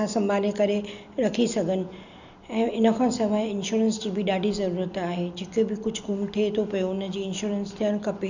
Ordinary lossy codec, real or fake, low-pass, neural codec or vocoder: none; real; 7.2 kHz; none